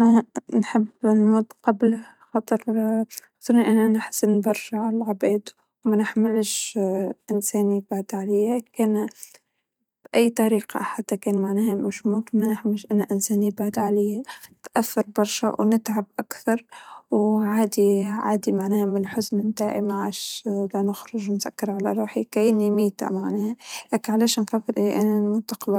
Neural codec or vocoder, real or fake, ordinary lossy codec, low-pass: vocoder, 44.1 kHz, 128 mel bands every 512 samples, BigVGAN v2; fake; none; 19.8 kHz